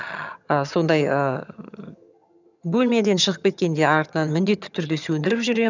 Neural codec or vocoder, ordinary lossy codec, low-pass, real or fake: vocoder, 22.05 kHz, 80 mel bands, HiFi-GAN; none; 7.2 kHz; fake